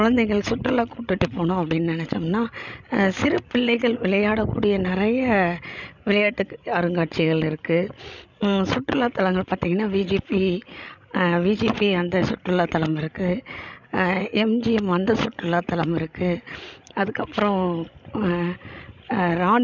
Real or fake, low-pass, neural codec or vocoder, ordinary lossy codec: fake; 7.2 kHz; codec, 16 kHz, 16 kbps, FreqCodec, larger model; none